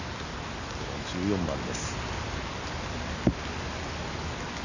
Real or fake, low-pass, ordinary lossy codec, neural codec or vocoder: real; 7.2 kHz; none; none